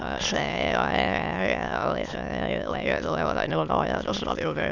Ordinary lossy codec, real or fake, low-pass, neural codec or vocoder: none; fake; 7.2 kHz; autoencoder, 22.05 kHz, a latent of 192 numbers a frame, VITS, trained on many speakers